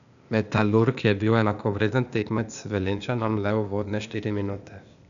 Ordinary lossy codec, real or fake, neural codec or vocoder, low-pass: none; fake; codec, 16 kHz, 0.8 kbps, ZipCodec; 7.2 kHz